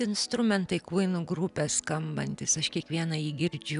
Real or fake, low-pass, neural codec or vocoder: real; 10.8 kHz; none